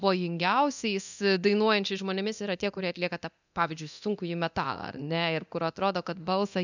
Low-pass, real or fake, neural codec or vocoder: 7.2 kHz; fake; codec, 24 kHz, 0.9 kbps, DualCodec